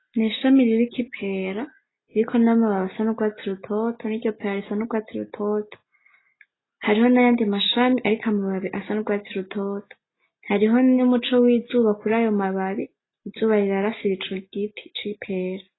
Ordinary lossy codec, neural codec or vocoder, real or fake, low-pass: AAC, 16 kbps; none; real; 7.2 kHz